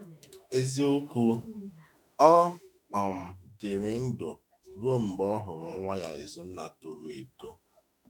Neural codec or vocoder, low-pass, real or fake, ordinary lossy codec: autoencoder, 48 kHz, 32 numbers a frame, DAC-VAE, trained on Japanese speech; 19.8 kHz; fake; none